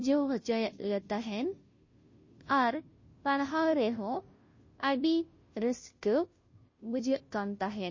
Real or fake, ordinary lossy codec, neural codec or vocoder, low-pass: fake; MP3, 32 kbps; codec, 16 kHz, 0.5 kbps, FunCodec, trained on Chinese and English, 25 frames a second; 7.2 kHz